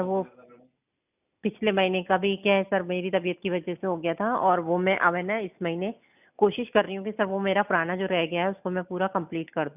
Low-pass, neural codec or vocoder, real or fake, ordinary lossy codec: 3.6 kHz; none; real; none